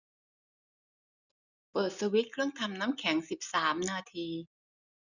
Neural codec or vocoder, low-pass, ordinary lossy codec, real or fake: none; 7.2 kHz; none; real